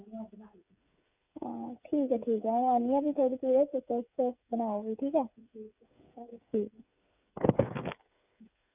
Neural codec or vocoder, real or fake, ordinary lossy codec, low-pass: codec, 16 kHz, 8 kbps, FreqCodec, smaller model; fake; none; 3.6 kHz